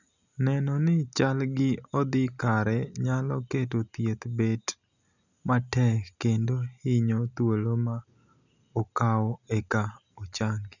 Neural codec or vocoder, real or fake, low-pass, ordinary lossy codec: none; real; 7.2 kHz; none